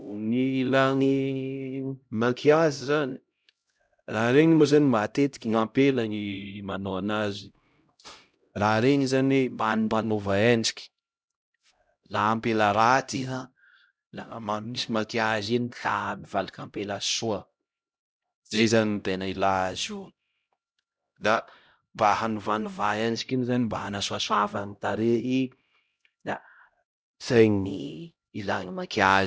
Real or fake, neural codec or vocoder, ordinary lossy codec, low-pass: fake; codec, 16 kHz, 0.5 kbps, X-Codec, HuBERT features, trained on LibriSpeech; none; none